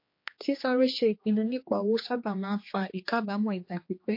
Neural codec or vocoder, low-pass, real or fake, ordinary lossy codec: codec, 16 kHz, 2 kbps, X-Codec, HuBERT features, trained on general audio; 5.4 kHz; fake; MP3, 32 kbps